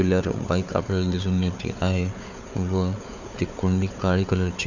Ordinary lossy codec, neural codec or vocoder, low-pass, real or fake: none; codec, 16 kHz, 4 kbps, FunCodec, trained on Chinese and English, 50 frames a second; 7.2 kHz; fake